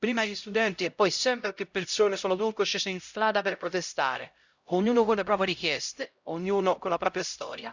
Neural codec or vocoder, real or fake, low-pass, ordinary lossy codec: codec, 16 kHz, 0.5 kbps, X-Codec, HuBERT features, trained on LibriSpeech; fake; 7.2 kHz; Opus, 64 kbps